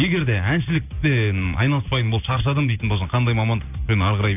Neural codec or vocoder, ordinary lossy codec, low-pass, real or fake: none; none; 3.6 kHz; real